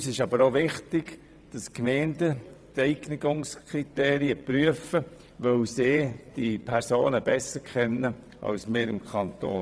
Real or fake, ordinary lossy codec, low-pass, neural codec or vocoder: fake; none; none; vocoder, 22.05 kHz, 80 mel bands, WaveNeXt